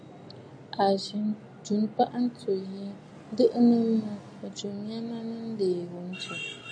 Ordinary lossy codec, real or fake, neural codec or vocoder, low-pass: AAC, 64 kbps; real; none; 9.9 kHz